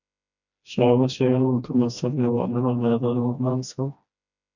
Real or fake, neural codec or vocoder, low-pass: fake; codec, 16 kHz, 1 kbps, FreqCodec, smaller model; 7.2 kHz